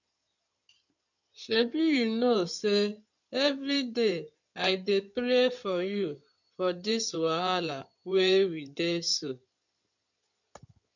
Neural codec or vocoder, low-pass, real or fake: codec, 16 kHz in and 24 kHz out, 2.2 kbps, FireRedTTS-2 codec; 7.2 kHz; fake